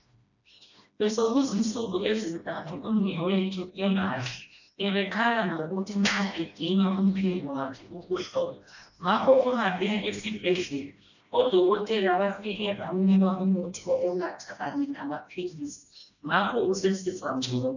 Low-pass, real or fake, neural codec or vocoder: 7.2 kHz; fake; codec, 16 kHz, 1 kbps, FreqCodec, smaller model